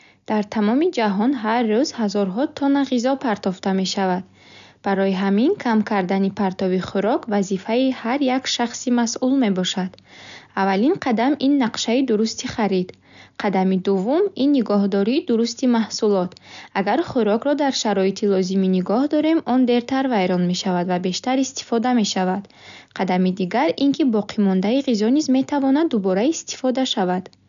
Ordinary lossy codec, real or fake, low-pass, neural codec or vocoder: none; real; 7.2 kHz; none